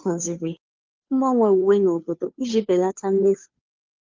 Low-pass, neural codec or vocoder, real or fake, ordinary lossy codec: 7.2 kHz; codec, 16 kHz, 2 kbps, FunCodec, trained on Chinese and English, 25 frames a second; fake; Opus, 32 kbps